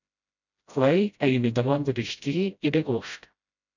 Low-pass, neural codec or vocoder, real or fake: 7.2 kHz; codec, 16 kHz, 0.5 kbps, FreqCodec, smaller model; fake